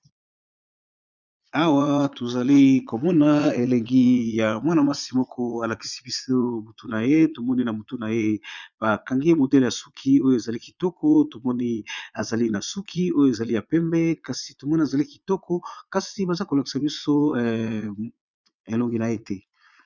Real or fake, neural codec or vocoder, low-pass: fake; vocoder, 22.05 kHz, 80 mel bands, Vocos; 7.2 kHz